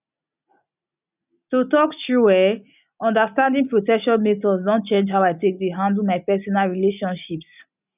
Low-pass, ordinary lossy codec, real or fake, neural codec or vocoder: 3.6 kHz; none; real; none